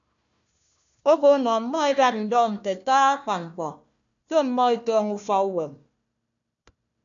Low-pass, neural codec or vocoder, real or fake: 7.2 kHz; codec, 16 kHz, 1 kbps, FunCodec, trained on Chinese and English, 50 frames a second; fake